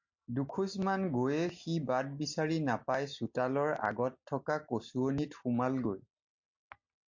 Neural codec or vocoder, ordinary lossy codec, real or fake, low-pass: none; MP3, 48 kbps; real; 7.2 kHz